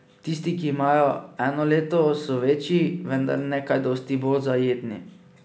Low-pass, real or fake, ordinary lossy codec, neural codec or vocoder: none; real; none; none